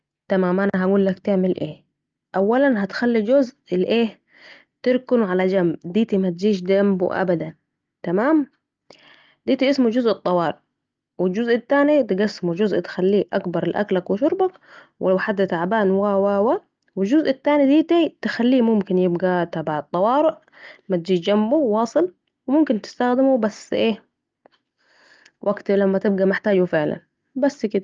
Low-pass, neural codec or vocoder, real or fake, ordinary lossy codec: 7.2 kHz; none; real; Opus, 24 kbps